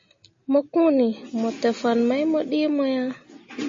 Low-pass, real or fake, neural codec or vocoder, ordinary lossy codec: 7.2 kHz; real; none; MP3, 32 kbps